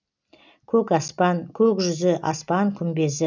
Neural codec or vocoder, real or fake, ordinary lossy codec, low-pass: none; real; none; 7.2 kHz